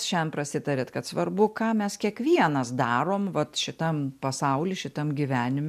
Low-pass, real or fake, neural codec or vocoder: 14.4 kHz; real; none